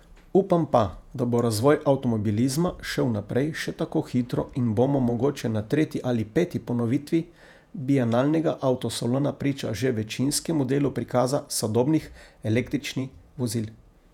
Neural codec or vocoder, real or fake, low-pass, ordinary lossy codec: vocoder, 48 kHz, 128 mel bands, Vocos; fake; 19.8 kHz; none